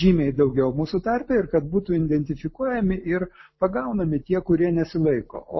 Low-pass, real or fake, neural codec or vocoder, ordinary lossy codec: 7.2 kHz; real; none; MP3, 24 kbps